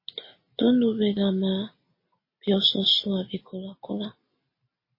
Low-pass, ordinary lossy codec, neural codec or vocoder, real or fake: 5.4 kHz; MP3, 24 kbps; none; real